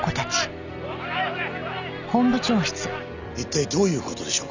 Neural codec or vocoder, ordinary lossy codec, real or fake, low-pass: none; none; real; 7.2 kHz